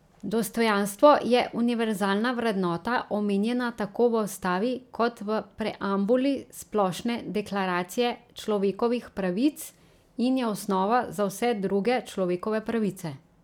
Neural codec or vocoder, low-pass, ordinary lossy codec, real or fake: none; 19.8 kHz; none; real